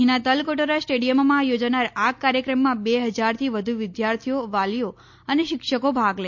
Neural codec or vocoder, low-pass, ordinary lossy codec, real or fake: none; 7.2 kHz; MP3, 48 kbps; real